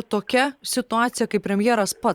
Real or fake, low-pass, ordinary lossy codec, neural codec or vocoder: fake; 19.8 kHz; Opus, 64 kbps; vocoder, 44.1 kHz, 128 mel bands every 512 samples, BigVGAN v2